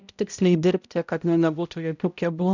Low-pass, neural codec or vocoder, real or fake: 7.2 kHz; codec, 16 kHz, 0.5 kbps, X-Codec, HuBERT features, trained on balanced general audio; fake